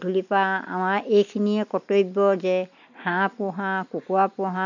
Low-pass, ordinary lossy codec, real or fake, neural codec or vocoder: 7.2 kHz; none; real; none